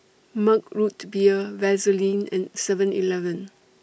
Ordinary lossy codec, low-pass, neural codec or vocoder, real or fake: none; none; none; real